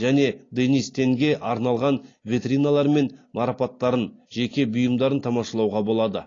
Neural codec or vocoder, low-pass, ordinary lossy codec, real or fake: none; 7.2 kHz; AAC, 32 kbps; real